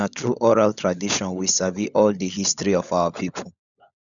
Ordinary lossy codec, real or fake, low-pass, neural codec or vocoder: none; fake; 7.2 kHz; codec, 16 kHz, 16 kbps, FunCodec, trained on LibriTTS, 50 frames a second